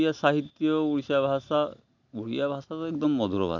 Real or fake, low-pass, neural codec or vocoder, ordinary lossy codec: real; 7.2 kHz; none; none